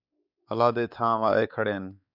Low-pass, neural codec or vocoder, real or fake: 5.4 kHz; codec, 16 kHz, 4 kbps, X-Codec, WavLM features, trained on Multilingual LibriSpeech; fake